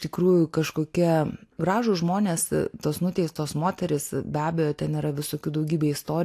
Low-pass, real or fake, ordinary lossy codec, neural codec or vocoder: 14.4 kHz; real; AAC, 64 kbps; none